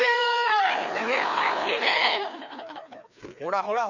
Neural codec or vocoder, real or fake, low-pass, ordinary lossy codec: codec, 16 kHz, 2 kbps, FreqCodec, larger model; fake; 7.2 kHz; none